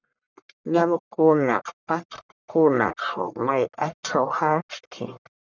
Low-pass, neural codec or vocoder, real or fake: 7.2 kHz; codec, 44.1 kHz, 1.7 kbps, Pupu-Codec; fake